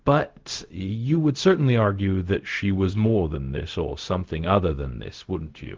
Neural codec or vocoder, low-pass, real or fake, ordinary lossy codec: codec, 16 kHz, 0.4 kbps, LongCat-Audio-Codec; 7.2 kHz; fake; Opus, 16 kbps